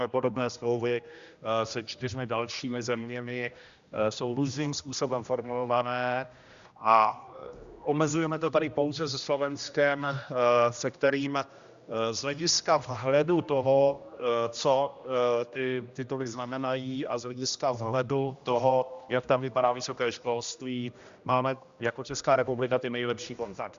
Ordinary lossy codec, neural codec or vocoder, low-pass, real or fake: Opus, 64 kbps; codec, 16 kHz, 1 kbps, X-Codec, HuBERT features, trained on general audio; 7.2 kHz; fake